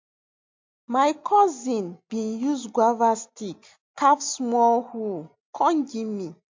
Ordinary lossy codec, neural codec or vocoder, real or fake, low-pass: MP3, 48 kbps; none; real; 7.2 kHz